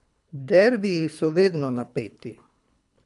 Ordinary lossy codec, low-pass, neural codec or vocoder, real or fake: none; 10.8 kHz; codec, 24 kHz, 3 kbps, HILCodec; fake